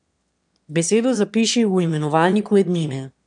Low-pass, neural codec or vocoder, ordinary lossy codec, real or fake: 9.9 kHz; autoencoder, 22.05 kHz, a latent of 192 numbers a frame, VITS, trained on one speaker; none; fake